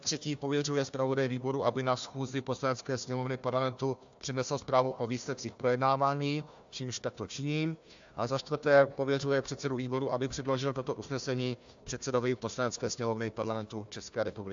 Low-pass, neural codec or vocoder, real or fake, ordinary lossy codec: 7.2 kHz; codec, 16 kHz, 1 kbps, FunCodec, trained on Chinese and English, 50 frames a second; fake; AAC, 64 kbps